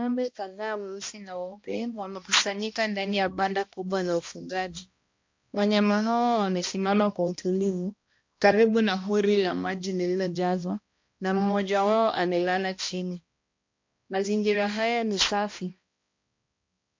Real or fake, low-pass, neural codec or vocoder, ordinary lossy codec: fake; 7.2 kHz; codec, 16 kHz, 1 kbps, X-Codec, HuBERT features, trained on balanced general audio; MP3, 48 kbps